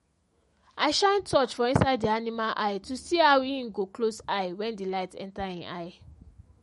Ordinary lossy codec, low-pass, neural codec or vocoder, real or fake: MP3, 48 kbps; 19.8 kHz; autoencoder, 48 kHz, 128 numbers a frame, DAC-VAE, trained on Japanese speech; fake